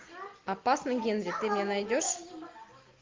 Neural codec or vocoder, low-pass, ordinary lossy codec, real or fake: none; 7.2 kHz; Opus, 24 kbps; real